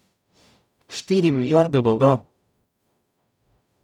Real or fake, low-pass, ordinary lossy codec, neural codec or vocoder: fake; 19.8 kHz; none; codec, 44.1 kHz, 0.9 kbps, DAC